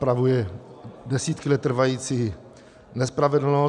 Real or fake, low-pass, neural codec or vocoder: real; 10.8 kHz; none